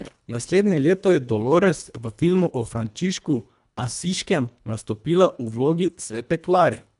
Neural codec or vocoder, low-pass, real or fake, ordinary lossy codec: codec, 24 kHz, 1.5 kbps, HILCodec; 10.8 kHz; fake; none